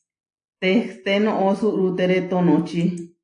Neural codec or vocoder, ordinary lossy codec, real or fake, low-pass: none; AAC, 48 kbps; real; 9.9 kHz